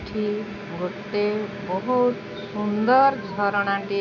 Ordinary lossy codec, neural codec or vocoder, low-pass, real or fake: none; none; 7.2 kHz; real